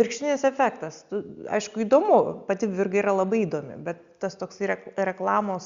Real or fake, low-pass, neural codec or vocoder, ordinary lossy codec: real; 7.2 kHz; none; Opus, 64 kbps